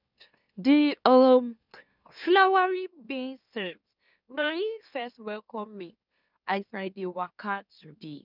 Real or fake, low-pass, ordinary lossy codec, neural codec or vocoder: fake; 5.4 kHz; none; autoencoder, 44.1 kHz, a latent of 192 numbers a frame, MeloTTS